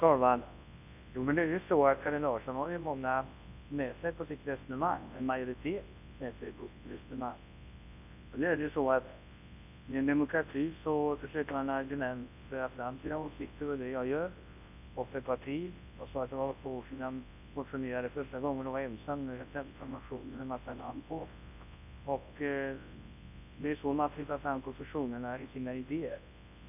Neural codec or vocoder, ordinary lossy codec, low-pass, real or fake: codec, 24 kHz, 0.9 kbps, WavTokenizer, large speech release; none; 3.6 kHz; fake